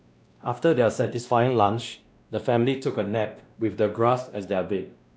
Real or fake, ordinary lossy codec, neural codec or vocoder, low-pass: fake; none; codec, 16 kHz, 1 kbps, X-Codec, WavLM features, trained on Multilingual LibriSpeech; none